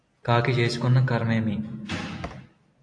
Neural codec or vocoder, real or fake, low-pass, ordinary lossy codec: none; real; 9.9 kHz; AAC, 48 kbps